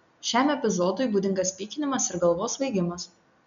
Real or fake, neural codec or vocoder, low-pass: real; none; 7.2 kHz